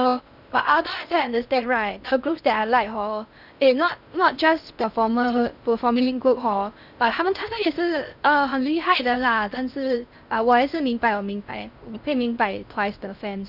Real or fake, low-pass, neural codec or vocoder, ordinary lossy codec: fake; 5.4 kHz; codec, 16 kHz in and 24 kHz out, 0.6 kbps, FocalCodec, streaming, 4096 codes; none